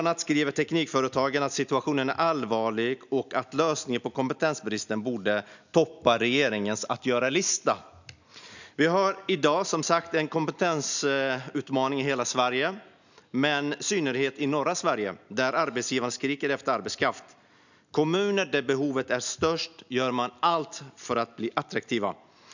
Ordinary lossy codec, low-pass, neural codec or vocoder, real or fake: none; 7.2 kHz; none; real